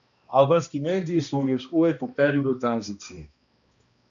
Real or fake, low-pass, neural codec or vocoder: fake; 7.2 kHz; codec, 16 kHz, 1 kbps, X-Codec, HuBERT features, trained on balanced general audio